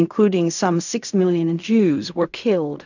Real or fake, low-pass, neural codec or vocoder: fake; 7.2 kHz; codec, 16 kHz in and 24 kHz out, 0.4 kbps, LongCat-Audio-Codec, fine tuned four codebook decoder